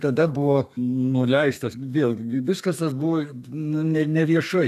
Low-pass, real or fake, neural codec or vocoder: 14.4 kHz; fake; codec, 32 kHz, 1.9 kbps, SNAC